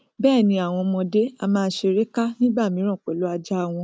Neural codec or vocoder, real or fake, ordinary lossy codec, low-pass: none; real; none; none